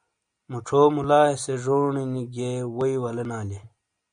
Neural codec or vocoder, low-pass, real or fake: none; 9.9 kHz; real